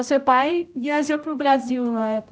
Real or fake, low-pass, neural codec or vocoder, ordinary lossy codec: fake; none; codec, 16 kHz, 0.5 kbps, X-Codec, HuBERT features, trained on general audio; none